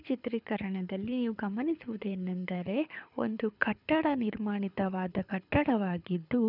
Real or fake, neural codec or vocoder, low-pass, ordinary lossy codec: fake; codec, 24 kHz, 6 kbps, HILCodec; 5.4 kHz; none